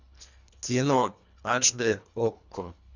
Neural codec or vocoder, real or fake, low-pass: codec, 24 kHz, 1.5 kbps, HILCodec; fake; 7.2 kHz